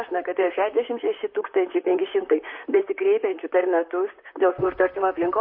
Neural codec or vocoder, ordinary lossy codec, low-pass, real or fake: vocoder, 44.1 kHz, 128 mel bands, Pupu-Vocoder; MP3, 32 kbps; 5.4 kHz; fake